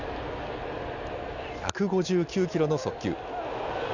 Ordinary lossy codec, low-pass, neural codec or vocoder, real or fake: none; 7.2 kHz; none; real